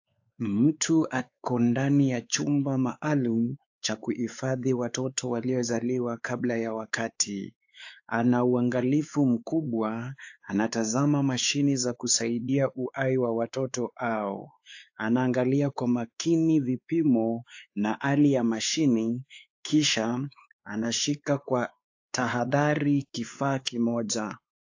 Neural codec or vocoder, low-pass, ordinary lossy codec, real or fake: codec, 16 kHz, 4 kbps, X-Codec, WavLM features, trained on Multilingual LibriSpeech; 7.2 kHz; AAC, 48 kbps; fake